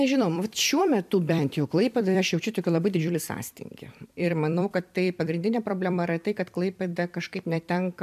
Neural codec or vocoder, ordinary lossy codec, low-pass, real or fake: vocoder, 44.1 kHz, 128 mel bands every 256 samples, BigVGAN v2; MP3, 96 kbps; 14.4 kHz; fake